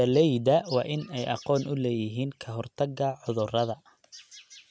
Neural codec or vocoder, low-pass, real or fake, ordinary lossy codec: none; none; real; none